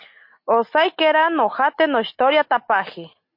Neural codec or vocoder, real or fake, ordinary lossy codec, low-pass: none; real; MP3, 32 kbps; 5.4 kHz